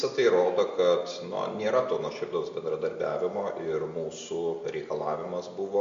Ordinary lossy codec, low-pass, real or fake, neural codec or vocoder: MP3, 48 kbps; 7.2 kHz; real; none